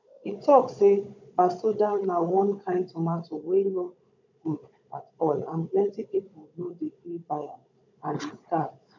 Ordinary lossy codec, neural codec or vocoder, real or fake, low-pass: none; codec, 16 kHz, 16 kbps, FunCodec, trained on Chinese and English, 50 frames a second; fake; 7.2 kHz